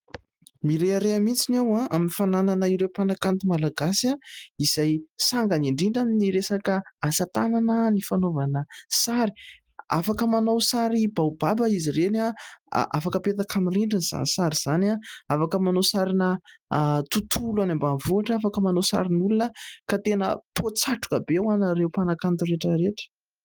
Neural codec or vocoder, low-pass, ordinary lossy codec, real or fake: none; 19.8 kHz; Opus, 24 kbps; real